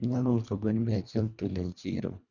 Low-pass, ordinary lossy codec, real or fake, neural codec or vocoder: 7.2 kHz; none; fake; codec, 24 kHz, 1.5 kbps, HILCodec